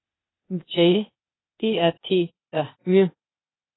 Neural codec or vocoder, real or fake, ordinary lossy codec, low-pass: codec, 16 kHz, 0.8 kbps, ZipCodec; fake; AAC, 16 kbps; 7.2 kHz